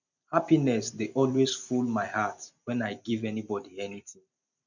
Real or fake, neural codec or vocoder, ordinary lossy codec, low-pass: fake; vocoder, 44.1 kHz, 128 mel bands every 256 samples, BigVGAN v2; none; 7.2 kHz